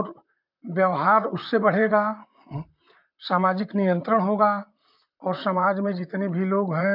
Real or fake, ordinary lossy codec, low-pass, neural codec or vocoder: fake; MP3, 48 kbps; 5.4 kHz; vocoder, 44.1 kHz, 128 mel bands, Pupu-Vocoder